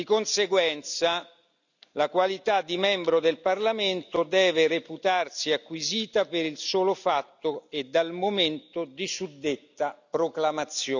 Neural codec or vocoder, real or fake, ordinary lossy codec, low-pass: none; real; none; 7.2 kHz